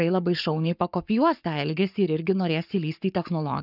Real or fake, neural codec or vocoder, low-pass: fake; codec, 44.1 kHz, 7.8 kbps, Pupu-Codec; 5.4 kHz